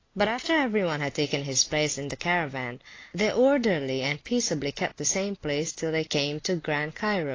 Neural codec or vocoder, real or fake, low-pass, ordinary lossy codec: none; real; 7.2 kHz; AAC, 32 kbps